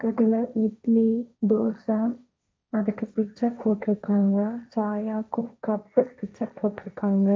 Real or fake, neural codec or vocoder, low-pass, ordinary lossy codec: fake; codec, 16 kHz, 1.1 kbps, Voila-Tokenizer; none; none